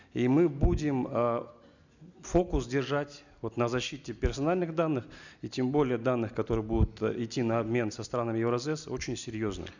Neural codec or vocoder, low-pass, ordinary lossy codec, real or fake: none; 7.2 kHz; none; real